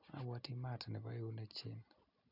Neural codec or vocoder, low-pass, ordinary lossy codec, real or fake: none; 5.4 kHz; none; real